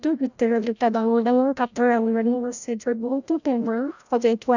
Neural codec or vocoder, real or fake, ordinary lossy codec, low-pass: codec, 16 kHz, 0.5 kbps, FreqCodec, larger model; fake; none; 7.2 kHz